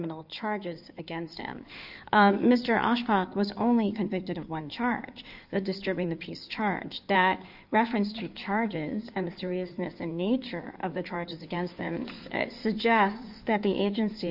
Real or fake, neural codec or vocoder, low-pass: fake; codec, 16 kHz in and 24 kHz out, 2.2 kbps, FireRedTTS-2 codec; 5.4 kHz